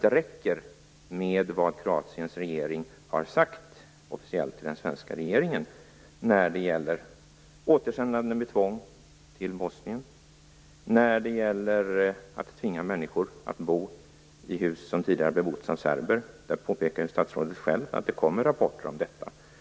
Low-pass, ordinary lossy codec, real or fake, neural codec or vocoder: none; none; real; none